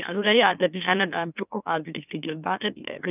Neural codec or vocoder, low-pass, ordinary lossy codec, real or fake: autoencoder, 44.1 kHz, a latent of 192 numbers a frame, MeloTTS; 3.6 kHz; none; fake